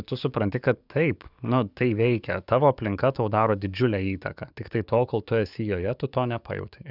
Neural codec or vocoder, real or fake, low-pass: codec, 44.1 kHz, 7.8 kbps, DAC; fake; 5.4 kHz